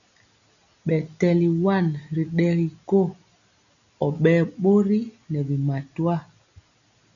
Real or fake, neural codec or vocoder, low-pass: real; none; 7.2 kHz